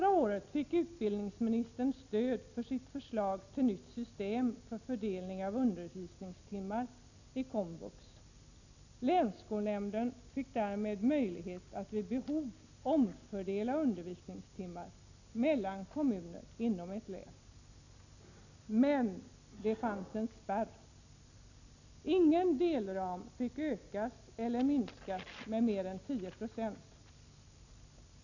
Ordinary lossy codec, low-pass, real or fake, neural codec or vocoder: none; 7.2 kHz; real; none